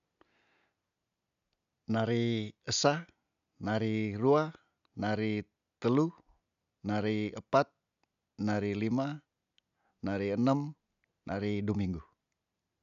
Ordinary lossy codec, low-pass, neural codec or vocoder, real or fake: none; 7.2 kHz; none; real